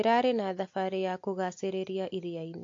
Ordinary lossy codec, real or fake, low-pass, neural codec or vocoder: AAC, 48 kbps; real; 7.2 kHz; none